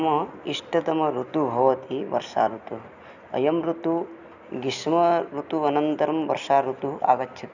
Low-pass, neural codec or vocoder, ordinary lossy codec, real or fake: 7.2 kHz; none; none; real